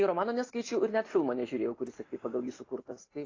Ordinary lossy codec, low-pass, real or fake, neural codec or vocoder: AAC, 32 kbps; 7.2 kHz; real; none